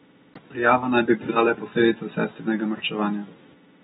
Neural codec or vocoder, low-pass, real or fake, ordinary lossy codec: none; 19.8 kHz; real; AAC, 16 kbps